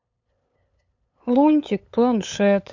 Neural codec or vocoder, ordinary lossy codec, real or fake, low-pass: codec, 16 kHz, 8 kbps, FunCodec, trained on LibriTTS, 25 frames a second; MP3, 48 kbps; fake; 7.2 kHz